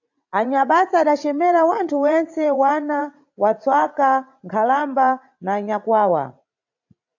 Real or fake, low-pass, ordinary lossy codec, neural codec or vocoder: fake; 7.2 kHz; AAC, 48 kbps; vocoder, 44.1 kHz, 80 mel bands, Vocos